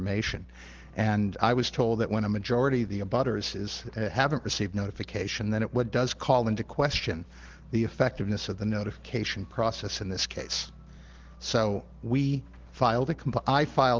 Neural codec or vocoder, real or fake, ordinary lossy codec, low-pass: none; real; Opus, 16 kbps; 7.2 kHz